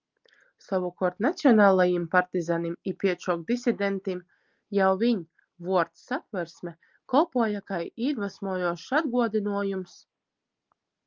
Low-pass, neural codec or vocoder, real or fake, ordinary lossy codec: 7.2 kHz; none; real; Opus, 32 kbps